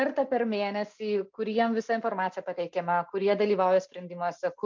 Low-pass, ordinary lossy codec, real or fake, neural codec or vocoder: 7.2 kHz; MP3, 64 kbps; real; none